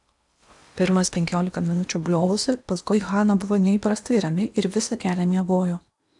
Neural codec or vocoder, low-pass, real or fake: codec, 16 kHz in and 24 kHz out, 0.8 kbps, FocalCodec, streaming, 65536 codes; 10.8 kHz; fake